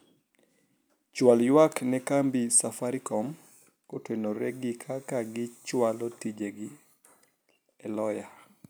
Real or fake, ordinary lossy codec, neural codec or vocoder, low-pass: real; none; none; none